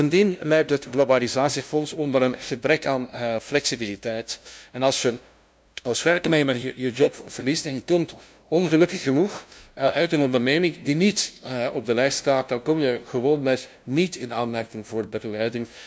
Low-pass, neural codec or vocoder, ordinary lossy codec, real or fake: none; codec, 16 kHz, 0.5 kbps, FunCodec, trained on LibriTTS, 25 frames a second; none; fake